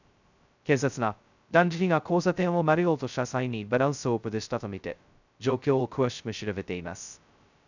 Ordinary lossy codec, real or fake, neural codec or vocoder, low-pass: none; fake; codec, 16 kHz, 0.2 kbps, FocalCodec; 7.2 kHz